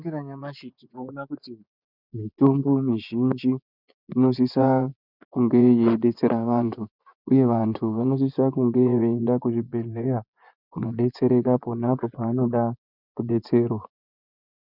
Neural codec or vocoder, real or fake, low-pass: vocoder, 24 kHz, 100 mel bands, Vocos; fake; 5.4 kHz